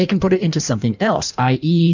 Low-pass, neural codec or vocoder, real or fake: 7.2 kHz; codec, 16 kHz in and 24 kHz out, 1.1 kbps, FireRedTTS-2 codec; fake